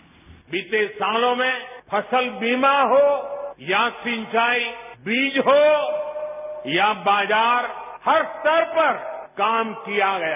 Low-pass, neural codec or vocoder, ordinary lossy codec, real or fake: 3.6 kHz; none; none; real